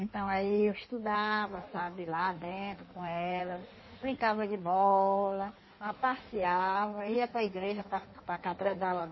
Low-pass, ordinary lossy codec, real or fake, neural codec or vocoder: 7.2 kHz; MP3, 24 kbps; fake; codec, 16 kHz in and 24 kHz out, 1.1 kbps, FireRedTTS-2 codec